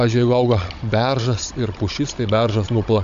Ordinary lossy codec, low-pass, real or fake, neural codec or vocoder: AAC, 64 kbps; 7.2 kHz; fake; codec, 16 kHz, 16 kbps, FunCodec, trained on Chinese and English, 50 frames a second